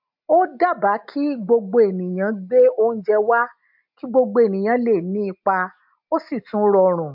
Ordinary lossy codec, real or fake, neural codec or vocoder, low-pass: MP3, 48 kbps; real; none; 5.4 kHz